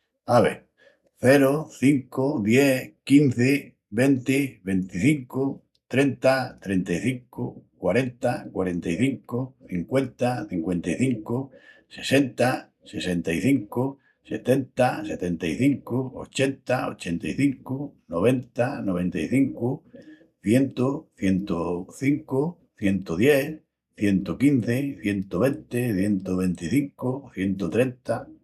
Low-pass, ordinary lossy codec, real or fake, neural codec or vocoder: 14.4 kHz; none; real; none